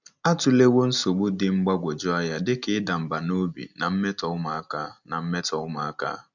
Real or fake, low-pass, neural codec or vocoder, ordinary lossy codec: real; 7.2 kHz; none; none